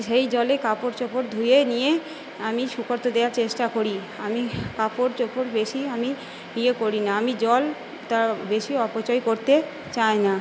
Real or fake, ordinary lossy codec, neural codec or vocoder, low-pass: real; none; none; none